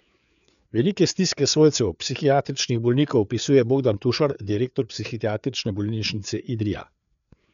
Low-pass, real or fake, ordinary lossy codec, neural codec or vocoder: 7.2 kHz; fake; none; codec, 16 kHz, 4 kbps, FreqCodec, larger model